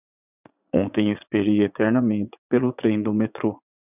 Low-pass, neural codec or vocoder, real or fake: 3.6 kHz; vocoder, 44.1 kHz, 80 mel bands, Vocos; fake